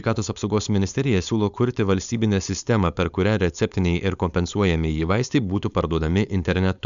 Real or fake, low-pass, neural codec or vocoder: fake; 7.2 kHz; codec, 16 kHz, 4.8 kbps, FACodec